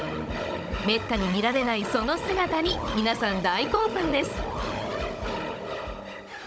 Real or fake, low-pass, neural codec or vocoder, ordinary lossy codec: fake; none; codec, 16 kHz, 16 kbps, FunCodec, trained on Chinese and English, 50 frames a second; none